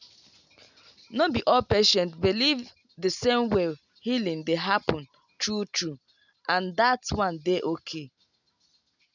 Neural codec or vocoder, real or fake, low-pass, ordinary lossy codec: none; real; 7.2 kHz; none